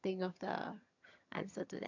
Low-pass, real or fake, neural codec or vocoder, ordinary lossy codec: 7.2 kHz; fake; vocoder, 22.05 kHz, 80 mel bands, HiFi-GAN; none